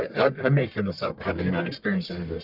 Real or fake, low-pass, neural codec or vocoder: fake; 5.4 kHz; codec, 44.1 kHz, 1.7 kbps, Pupu-Codec